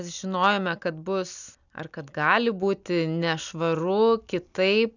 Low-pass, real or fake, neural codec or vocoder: 7.2 kHz; real; none